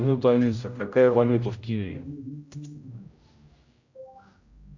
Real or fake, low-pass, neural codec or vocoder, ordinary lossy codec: fake; 7.2 kHz; codec, 16 kHz, 0.5 kbps, X-Codec, HuBERT features, trained on general audio; Opus, 64 kbps